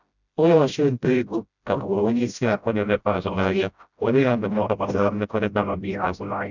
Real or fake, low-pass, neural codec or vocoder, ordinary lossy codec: fake; 7.2 kHz; codec, 16 kHz, 0.5 kbps, FreqCodec, smaller model; none